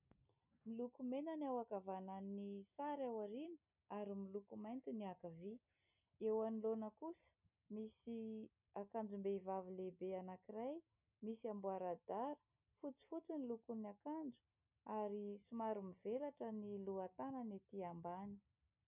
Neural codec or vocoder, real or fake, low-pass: none; real; 3.6 kHz